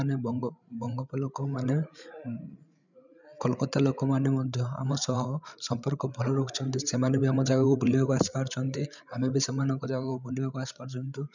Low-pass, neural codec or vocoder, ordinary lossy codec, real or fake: 7.2 kHz; codec, 16 kHz, 8 kbps, FreqCodec, larger model; none; fake